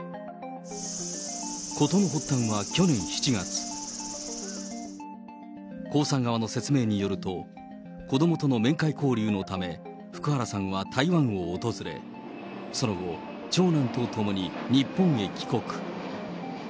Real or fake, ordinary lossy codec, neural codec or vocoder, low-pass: real; none; none; none